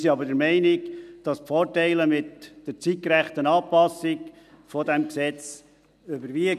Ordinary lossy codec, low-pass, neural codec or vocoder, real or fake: none; 14.4 kHz; none; real